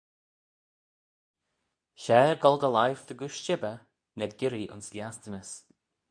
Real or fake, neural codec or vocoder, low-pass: fake; codec, 24 kHz, 0.9 kbps, WavTokenizer, medium speech release version 2; 9.9 kHz